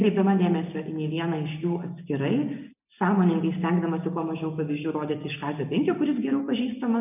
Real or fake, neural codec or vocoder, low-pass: real; none; 3.6 kHz